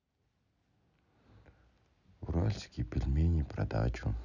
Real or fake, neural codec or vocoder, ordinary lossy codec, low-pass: real; none; none; 7.2 kHz